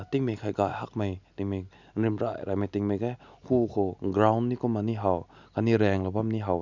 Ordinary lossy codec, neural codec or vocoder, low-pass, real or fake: none; none; 7.2 kHz; real